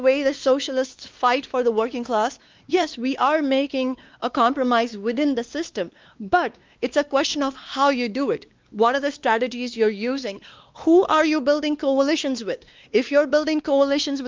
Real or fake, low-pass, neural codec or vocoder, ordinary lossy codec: fake; 7.2 kHz; codec, 16 kHz, 2 kbps, X-Codec, WavLM features, trained on Multilingual LibriSpeech; Opus, 24 kbps